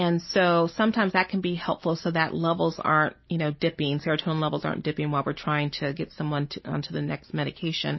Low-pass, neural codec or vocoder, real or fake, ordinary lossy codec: 7.2 kHz; none; real; MP3, 24 kbps